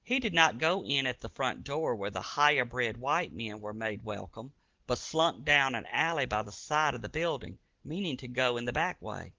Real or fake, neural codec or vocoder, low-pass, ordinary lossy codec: real; none; 7.2 kHz; Opus, 16 kbps